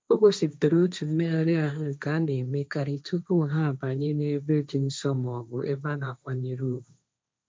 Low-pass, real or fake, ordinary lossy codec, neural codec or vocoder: none; fake; none; codec, 16 kHz, 1.1 kbps, Voila-Tokenizer